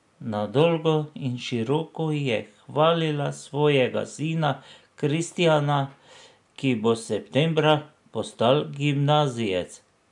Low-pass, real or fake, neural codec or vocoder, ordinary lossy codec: 10.8 kHz; real; none; none